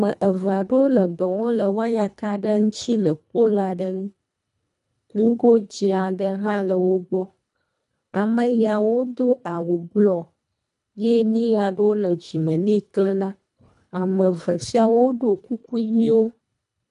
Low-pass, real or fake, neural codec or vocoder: 10.8 kHz; fake; codec, 24 kHz, 1.5 kbps, HILCodec